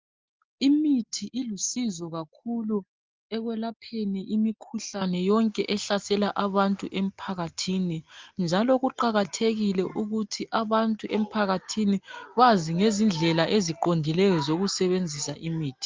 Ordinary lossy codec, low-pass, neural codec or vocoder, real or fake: Opus, 32 kbps; 7.2 kHz; none; real